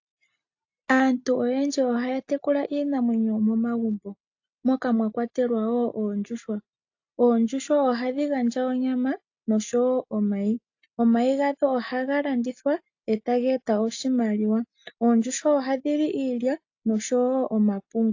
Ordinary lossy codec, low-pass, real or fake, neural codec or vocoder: AAC, 48 kbps; 7.2 kHz; real; none